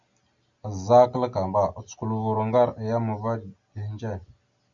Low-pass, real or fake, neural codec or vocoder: 7.2 kHz; real; none